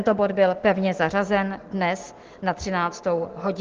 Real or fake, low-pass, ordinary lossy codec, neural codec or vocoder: real; 7.2 kHz; Opus, 16 kbps; none